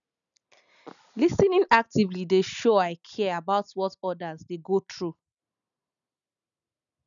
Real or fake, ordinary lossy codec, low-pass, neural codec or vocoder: real; none; 7.2 kHz; none